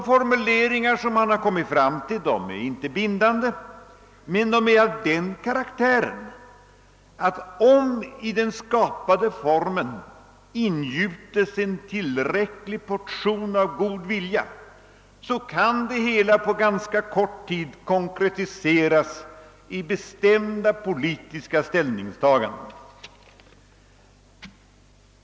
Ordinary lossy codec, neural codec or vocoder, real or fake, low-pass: none; none; real; none